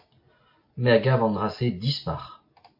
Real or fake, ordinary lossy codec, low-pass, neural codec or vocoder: real; MP3, 32 kbps; 5.4 kHz; none